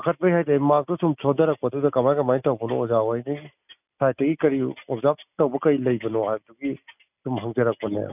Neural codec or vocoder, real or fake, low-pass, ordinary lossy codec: none; real; 3.6 kHz; none